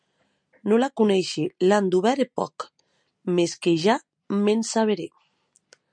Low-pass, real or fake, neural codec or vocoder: 9.9 kHz; real; none